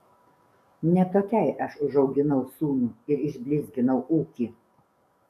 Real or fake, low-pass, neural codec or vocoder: fake; 14.4 kHz; codec, 44.1 kHz, 7.8 kbps, DAC